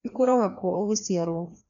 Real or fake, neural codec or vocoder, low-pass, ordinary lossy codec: fake; codec, 16 kHz, 1 kbps, FreqCodec, larger model; 7.2 kHz; none